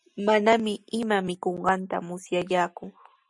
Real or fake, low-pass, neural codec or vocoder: real; 10.8 kHz; none